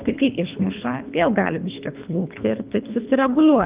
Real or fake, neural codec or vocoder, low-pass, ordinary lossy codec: fake; codec, 24 kHz, 3 kbps, HILCodec; 3.6 kHz; Opus, 24 kbps